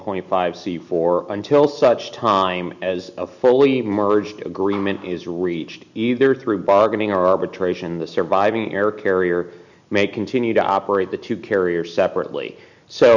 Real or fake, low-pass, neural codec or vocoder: real; 7.2 kHz; none